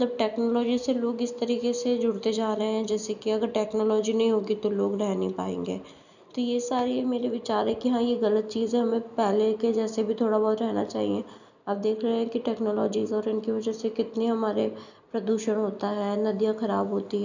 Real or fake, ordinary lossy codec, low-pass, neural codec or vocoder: real; none; 7.2 kHz; none